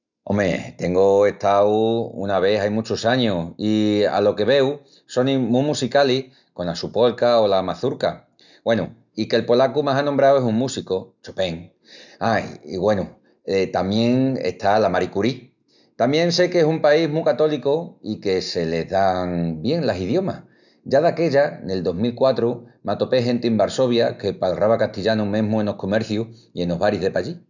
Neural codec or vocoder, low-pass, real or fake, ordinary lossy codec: none; 7.2 kHz; real; none